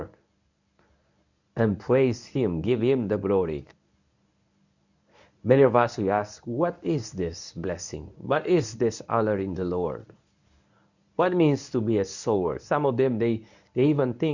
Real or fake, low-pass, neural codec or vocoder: fake; 7.2 kHz; codec, 24 kHz, 0.9 kbps, WavTokenizer, medium speech release version 1